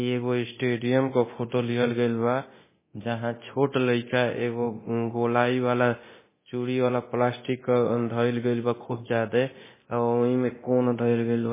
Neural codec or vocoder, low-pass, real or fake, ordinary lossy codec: codec, 24 kHz, 0.9 kbps, DualCodec; 3.6 kHz; fake; MP3, 16 kbps